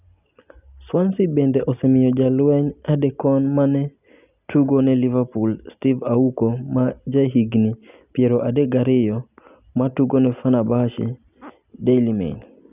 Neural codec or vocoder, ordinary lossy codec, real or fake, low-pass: none; none; real; 3.6 kHz